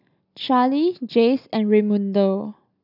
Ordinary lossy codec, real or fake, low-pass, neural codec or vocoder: none; real; 5.4 kHz; none